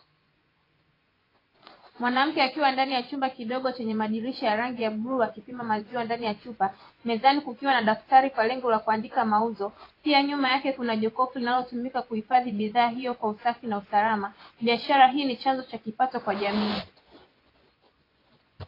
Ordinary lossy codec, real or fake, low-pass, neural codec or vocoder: AAC, 24 kbps; fake; 5.4 kHz; vocoder, 24 kHz, 100 mel bands, Vocos